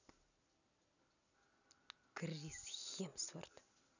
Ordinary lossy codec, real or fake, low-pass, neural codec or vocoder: none; real; 7.2 kHz; none